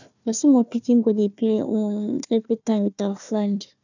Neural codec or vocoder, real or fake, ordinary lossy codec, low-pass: codec, 16 kHz, 1 kbps, FunCodec, trained on Chinese and English, 50 frames a second; fake; none; 7.2 kHz